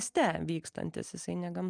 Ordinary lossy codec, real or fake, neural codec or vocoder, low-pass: Opus, 32 kbps; real; none; 9.9 kHz